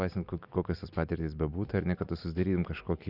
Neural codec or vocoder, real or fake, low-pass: none; real; 5.4 kHz